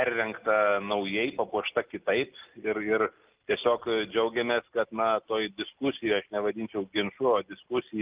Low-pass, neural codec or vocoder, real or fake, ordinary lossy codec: 3.6 kHz; none; real; Opus, 32 kbps